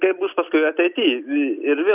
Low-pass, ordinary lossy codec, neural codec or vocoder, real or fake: 3.6 kHz; AAC, 32 kbps; none; real